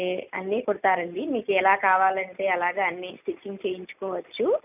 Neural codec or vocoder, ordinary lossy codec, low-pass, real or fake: none; none; 3.6 kHz; real